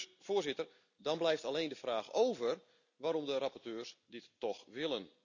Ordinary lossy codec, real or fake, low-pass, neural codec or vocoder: none; real; 7.2 kHz; none